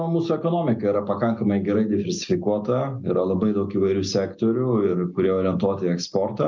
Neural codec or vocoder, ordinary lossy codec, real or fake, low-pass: none; MP3, 64 kbps; real; 7.2 kHz